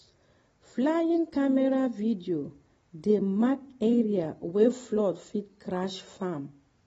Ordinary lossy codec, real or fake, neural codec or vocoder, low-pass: AAC, 24 kbps; real; none; 10.8 kHz